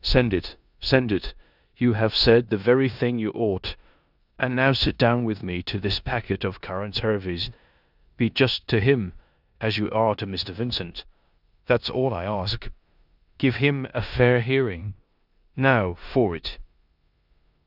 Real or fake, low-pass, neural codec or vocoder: fake; 5.4 kHz; codec, 16 kHz in and 24 kHz out, 0.9 kbps, LongCat-Audio-Codec, four codebook decoder